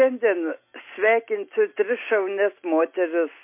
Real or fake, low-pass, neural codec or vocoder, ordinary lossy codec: real; 3.6 kHz; none; MP3, 24 kbps